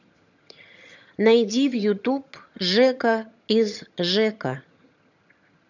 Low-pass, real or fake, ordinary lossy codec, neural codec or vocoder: 7.2 kHz; fake; none; vocoder, 22.05 kHz, 80 mel bands, HiFi-GAN